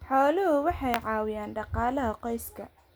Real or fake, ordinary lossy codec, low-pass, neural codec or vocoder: real; none; none; none